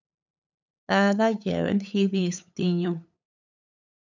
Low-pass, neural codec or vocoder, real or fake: 7.2 kHz; codec, 16 kHz, 8 kbps, FunCodec, trained on LibriTTS, 25 frames a second; fake